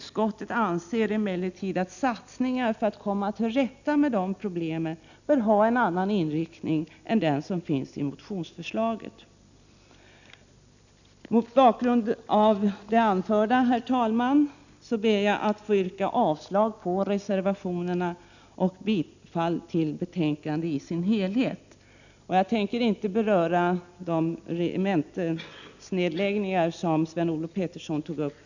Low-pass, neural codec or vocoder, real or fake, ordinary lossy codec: 7.2 kHz; none; real; none